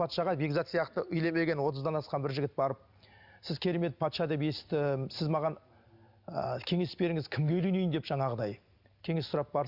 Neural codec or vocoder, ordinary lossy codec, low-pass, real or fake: none; Opus, 64 kbps; 5.4 kHz; real